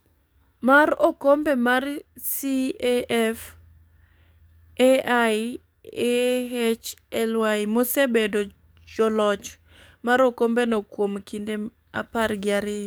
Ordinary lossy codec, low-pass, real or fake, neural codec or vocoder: none; none; fake; codec, 44.1 kHz, 7.8 kbps, DAC